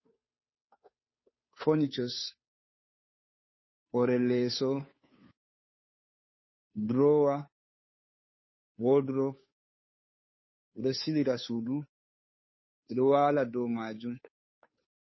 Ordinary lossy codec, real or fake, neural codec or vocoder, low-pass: MP3, 24 kbps; fake; codec, 16 kHz, 2 kbps, FunCodec, trained on Chinese and English, 25 frames a second; 7.2 kHz